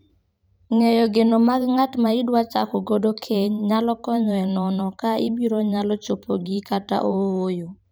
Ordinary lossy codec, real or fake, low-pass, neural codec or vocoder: none; fake; none; vocoder, 44.1 kHz, 128 mel bands every 512 samples, BigVGAN v2